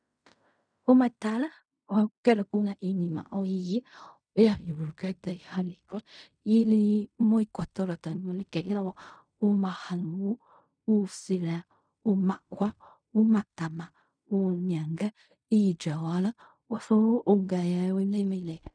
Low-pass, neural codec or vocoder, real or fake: 9.9 kHz; codec, 16 kHz in and 24 kHz out, 0.4 kbps, LongCat-Audio-Codec, fine tuned four codebook decoder; fake